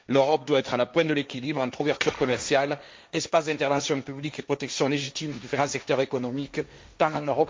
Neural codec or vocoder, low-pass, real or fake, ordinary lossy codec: codec, 16 kHz, 1.1 kbps, Voila-Tokenizer; none; fake; none